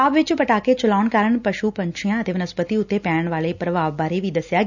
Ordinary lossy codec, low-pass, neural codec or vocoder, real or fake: none; 7.2 kHz; none; real